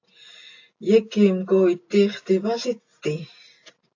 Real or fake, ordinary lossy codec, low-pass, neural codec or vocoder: real; AAC, 48 kbps; 7.2 kHz; none